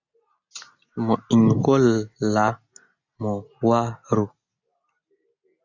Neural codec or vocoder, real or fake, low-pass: none; real; 7.2 kHz